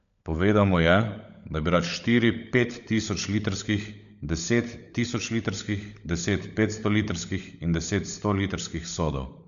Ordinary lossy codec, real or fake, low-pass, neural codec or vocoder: none; fake; 7.2 kHz; codec, 16 kHz, 16 kbps, FunCodec, trained on LibriTTS, 50 frames a second